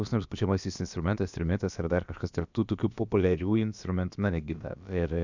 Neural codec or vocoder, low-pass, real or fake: codec, 16 kHz, about 1 kbps, DyCAST, with the encoder's durations; 7.2 kHz; fake